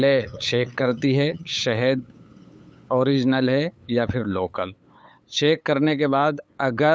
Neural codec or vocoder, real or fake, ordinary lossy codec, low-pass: codec, 16 kHz, 8 kbps, FunCodec, trained on LibriTTS, 25 frames a second; fake; none; none